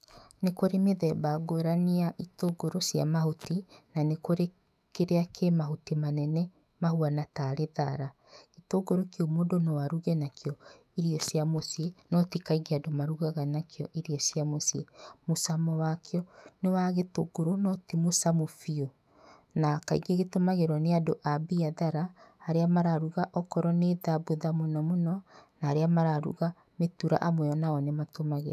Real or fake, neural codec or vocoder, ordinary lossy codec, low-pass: fake; autoencoder, 48 kHz, 128 numbers a frame, DAC-VAE, trained on Japanese speech; none; 14.4 kHz